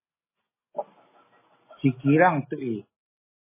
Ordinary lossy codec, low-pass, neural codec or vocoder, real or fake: MP3, 16 kbps; 3.6 kHz; none; real